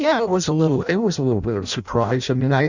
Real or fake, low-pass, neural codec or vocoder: fake; 7.2 kHz; codec, 16 kHz in and 24 kHz out, 0.6 kbps, FireRedTTS-2 codec